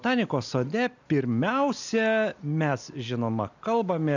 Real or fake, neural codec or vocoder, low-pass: real; none; 7.2 kHz